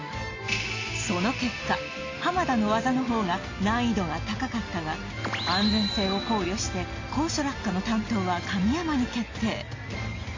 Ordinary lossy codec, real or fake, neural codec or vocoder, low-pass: AAC, 32 kbps; real; none; 7.2 kHz